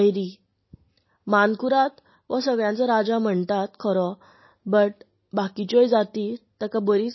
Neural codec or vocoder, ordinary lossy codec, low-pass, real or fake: none; MP3, 24 kbps; 7.2 kHz; real